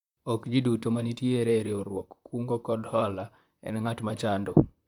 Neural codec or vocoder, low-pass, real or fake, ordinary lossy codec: vocoder, 44.1 kHz, 128 mel bands, Pupu-Vocoder; 19.8 kHz; fake; none